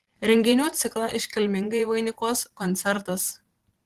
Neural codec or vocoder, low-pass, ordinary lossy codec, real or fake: vocoder, 44.1 kHz, 128 mel bands every 512 samples, BigVGAN v2; 14.4 kHz; Opus, 16 kbps; fake